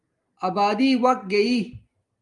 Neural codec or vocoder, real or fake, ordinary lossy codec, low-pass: none; real; Opus, 32 kbps; 10.8 kHz